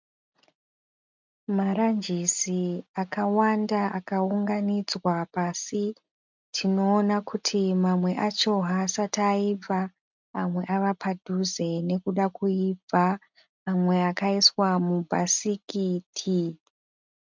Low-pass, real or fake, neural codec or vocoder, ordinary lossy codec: 7.2 kHz; real; none; MP3, 64 kbps